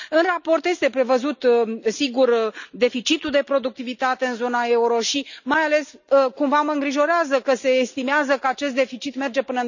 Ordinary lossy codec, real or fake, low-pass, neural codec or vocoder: none; real; 7.2 kHz; none